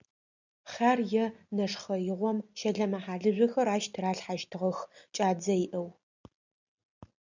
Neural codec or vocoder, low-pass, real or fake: none; 7.2 kHz; real